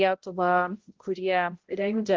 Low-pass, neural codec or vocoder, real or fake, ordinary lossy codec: 7.2 kHz; codec, 16 kHz, 0.5 kbps, X-Codec, HuBERT features, trained on balanced general audio; fake; Opus, 16 kbps